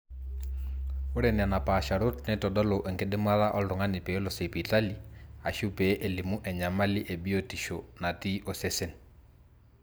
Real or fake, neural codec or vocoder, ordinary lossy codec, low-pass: real; none; none; none